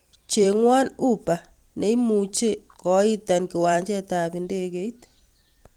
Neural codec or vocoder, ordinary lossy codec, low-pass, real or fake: vocoder, 44.1 kHz, 128 mel bands every 256 samples, BigVGAN v2; Opus, 24 kbps; 19.8 kHz; fake